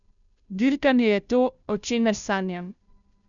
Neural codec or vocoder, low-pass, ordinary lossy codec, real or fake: codec, 16 kHz, 0.5 kbps, FunCodec, trained on Chinese and English, 25 frames a second; 7.2 kHz; none; fake